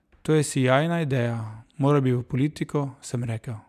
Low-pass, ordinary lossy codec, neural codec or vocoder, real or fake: 14.4 kHz; none; none; real